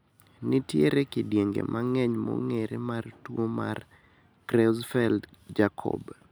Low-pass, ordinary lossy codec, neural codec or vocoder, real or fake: none; none; none; real